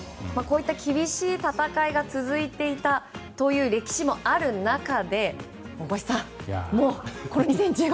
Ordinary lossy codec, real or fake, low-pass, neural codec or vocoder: none; real; none; none